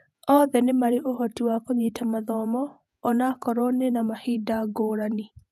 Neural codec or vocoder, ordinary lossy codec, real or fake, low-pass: vocoder, 48 kHz, 128 mel bands, Vocos; none; fake; 14.4 kHz